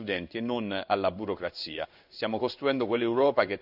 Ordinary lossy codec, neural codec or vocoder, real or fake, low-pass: none; codec, 16 kHz in and 24 kHz out, 1 kbps, XY-Tokenizer; fake; 5.4 kHz